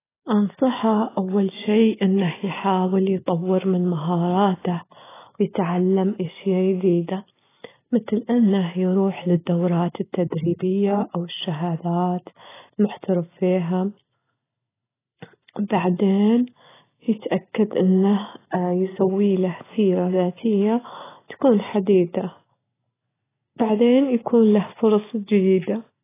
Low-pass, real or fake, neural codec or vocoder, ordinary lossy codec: 3.6 kHz; real; none; AAC, 16 kbps